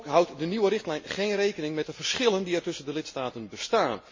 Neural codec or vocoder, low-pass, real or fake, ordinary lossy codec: none; 7.2 kHz; real; MP3, 32 kbps